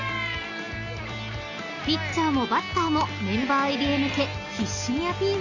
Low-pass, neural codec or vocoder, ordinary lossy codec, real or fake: 7.2 kHz; none; none; real